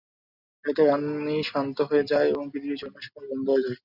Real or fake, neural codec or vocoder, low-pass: real; none; 5.4 kHz